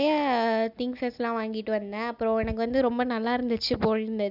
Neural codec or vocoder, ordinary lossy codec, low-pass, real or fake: none; none; 5.4 kHz; real